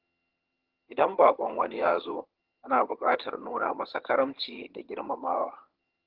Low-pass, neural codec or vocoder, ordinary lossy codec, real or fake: 5.4 kHz; vocoder, 22.05 kHz, 80 mel bands, HiFi-GAN; Opus, 16 kbps; fake